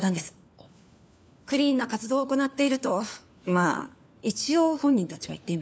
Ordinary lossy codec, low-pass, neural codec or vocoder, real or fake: none; none; codec, 16 kHz, 4 kbps, FunCodec, trained on LibriTTS, 50 frames a second; fake